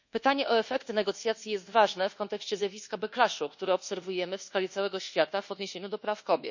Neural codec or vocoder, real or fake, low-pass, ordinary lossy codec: codec, 24 kHz, 1.2 kbps, DualCodec; fake; 7.2 kHz; none